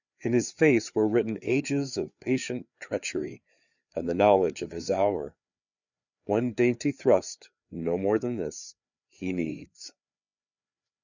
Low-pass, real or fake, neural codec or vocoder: 7.2 kHz; fake; codec, 16 kHz, 4 kbps, FreqCodec, larger model